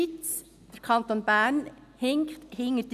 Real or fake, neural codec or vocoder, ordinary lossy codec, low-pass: real; none; none; 14.4 kHz